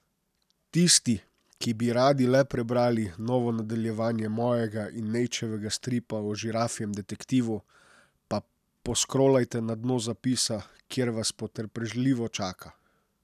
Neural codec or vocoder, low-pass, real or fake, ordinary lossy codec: none; 14.4 kHz; real; none